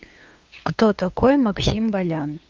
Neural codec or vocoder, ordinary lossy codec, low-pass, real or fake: codec, 16 kHz, 2 kbps, FunCodec, trained on LibriTTS, 25 frames a second; Opus, 24 kbps; 7.2 kHz; fake